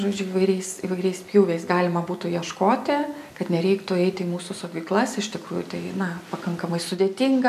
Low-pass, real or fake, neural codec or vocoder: 14.4 kHz; real; none